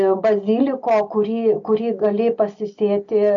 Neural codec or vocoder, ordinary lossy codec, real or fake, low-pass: none; AAC, 64 kbps; real; 7.2 kHz